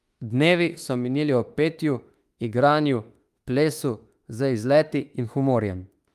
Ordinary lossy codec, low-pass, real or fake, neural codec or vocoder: Opus, 32 kbps; 14.4 kHz; fake; autoencoder, 48 kHz, 32 numbers a frame, DAC-VAE, trained on Japanese speech